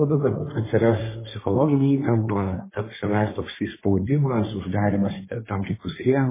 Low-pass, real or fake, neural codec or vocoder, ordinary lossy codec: 3.6 kHz; fake; codec, 24 kHz, 1 kbps, SNAC; MP3, 16 kbps